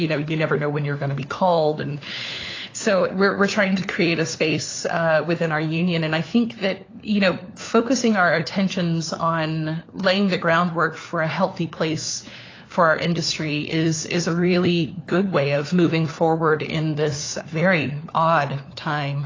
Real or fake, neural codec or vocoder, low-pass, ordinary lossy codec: fake; codec, 16 kHz, 4 kbps, FunCodec, trained on LibriTTS, 50 frames a second; 7.2 kHz; AAC, 32 kbps